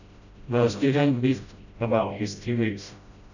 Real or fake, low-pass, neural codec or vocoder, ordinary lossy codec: fake; 7.2 kHz; codec, 16 kHz, 0.5 kbps, FreqCodec, smaller model; none